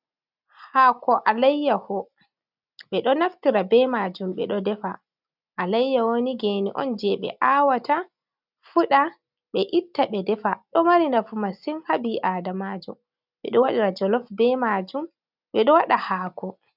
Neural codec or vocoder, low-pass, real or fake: none; 5.4 kHz; real